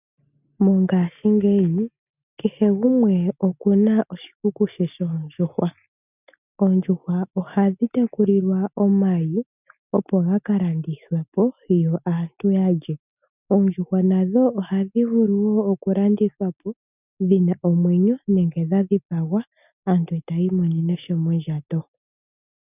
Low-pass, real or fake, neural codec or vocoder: 3.6 kHz; real; none